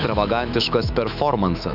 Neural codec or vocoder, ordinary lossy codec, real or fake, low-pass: autoencoder, 48 kHz, 128 numbers a frame, DAC-VAE, trained on Japanese speech; AAC, 48 kbps; fake; 5.4 kHz